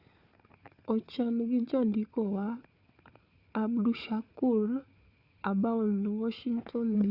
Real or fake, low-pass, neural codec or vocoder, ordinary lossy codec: fake; 5.4 kHz; codec, 16 kHz, 4 kbps, FunCodec, trained on Chinese and English, 50 frames a second; Opus, 64 kbps